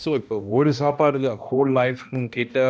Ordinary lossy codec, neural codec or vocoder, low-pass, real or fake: none; codec, 16 kHz, 1 kbps, X-Codec, HuBERT features, trained on balanced general audio; none; fake